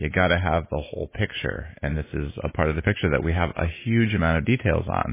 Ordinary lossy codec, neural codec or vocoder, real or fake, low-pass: MP3, 16 kbps; none; real; 3.6 kHz